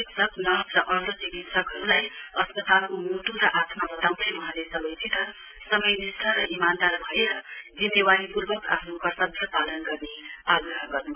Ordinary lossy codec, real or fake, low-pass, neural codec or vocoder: none; real; 3.6 kHz; none